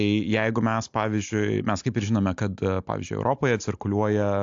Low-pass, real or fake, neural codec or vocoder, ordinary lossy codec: 7.2 kHz; real; none; AAC, 64 kbps